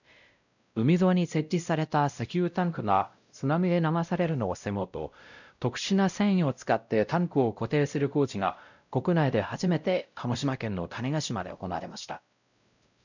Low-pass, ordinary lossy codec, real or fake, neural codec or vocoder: 7.2 kHz; none; fake; codec, 16 kHz, 0.5 kbps, X-Codec, WavLM features, trained on Multilingual LibriSpeech